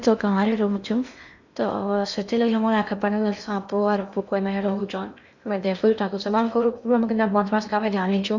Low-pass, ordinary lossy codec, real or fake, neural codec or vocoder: 7.2 kHz; none; fake; codec, 16 kHz in and 24 kHz out, 0.8 kbps, FocalCodec, streaming, 65536 codes